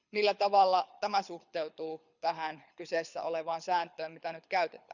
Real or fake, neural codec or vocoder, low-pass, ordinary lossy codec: fake; codec, 24 kHz, 6 kbps, HILCodec; 7.2 kHz; none